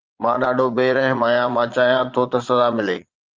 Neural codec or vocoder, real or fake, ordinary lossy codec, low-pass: vocoder, 22.05 kHz, 80 mel bands, Vocos; fake; Opus, 32 kbps; 7.2 kHz